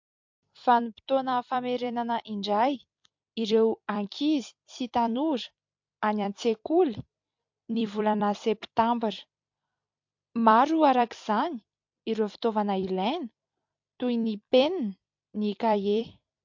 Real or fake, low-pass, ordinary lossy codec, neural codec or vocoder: fake; 7.2 kHz; MP3, 48 kbps; vocoder, 44.1 kHz, 128 mel bands every 256 samples, BigVGAN v2